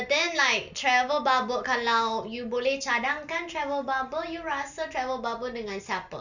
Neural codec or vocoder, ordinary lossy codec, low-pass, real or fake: none; none; 7.2 kHz; real